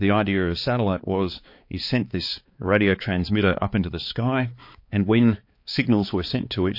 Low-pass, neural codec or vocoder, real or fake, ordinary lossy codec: 5.4 kHz; codec, 16 kHz, 4 kbps, X-Codec, HuBERT features, trained on balanced general audio; fake; MP3, 32 kbps